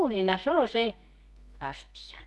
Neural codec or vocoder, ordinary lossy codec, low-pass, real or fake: codec, 24 kHz, 0.9 kbps, WavTokenizer, medium music audio release; none; none; fake